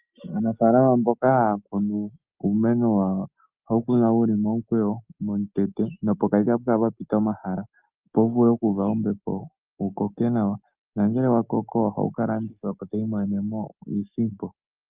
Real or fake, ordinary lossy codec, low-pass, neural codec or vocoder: real; Opus, 24 kbps; 3.6 kHz; none